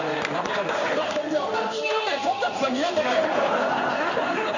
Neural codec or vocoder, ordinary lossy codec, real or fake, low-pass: codec, 16 kHz in and 24 kHz out, 1 kbps, XY-Tokenizer; none; fake; 7.2 kHz